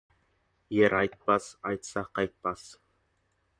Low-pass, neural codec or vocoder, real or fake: 9.9 kHz; vocoder, 44.1 kHz, 128 mel bands, Pupu-Vocoder; fake